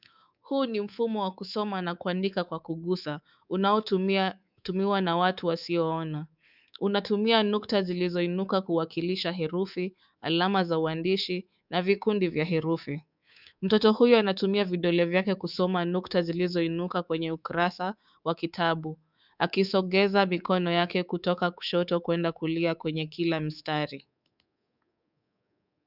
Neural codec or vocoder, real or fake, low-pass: codec, 24 kHz, 3.1 kbps, DualCodec; fake; 5.4 kHz